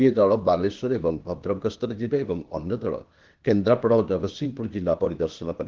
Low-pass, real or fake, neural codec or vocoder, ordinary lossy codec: 7.2 kHz; fake; codec, 16 kHz, 0.8 kbps, ZipCodec; Opus, 16 kbps